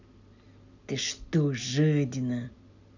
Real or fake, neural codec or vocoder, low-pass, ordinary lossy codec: real; none; 7.2 kHz; none